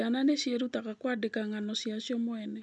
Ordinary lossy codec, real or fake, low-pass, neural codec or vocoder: none; real; 10.8 kHz; none